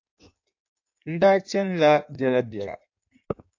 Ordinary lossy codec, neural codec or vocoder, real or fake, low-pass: AAC, 48 kbps; codec, 16 kHz in and 24 kHz out, 1.1 kbps, FireRedTTS-2 codec; fake; 7.2 kHz